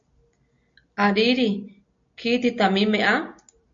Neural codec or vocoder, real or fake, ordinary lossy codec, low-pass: none; real; MP3, 48 kbps; 7.2 kHz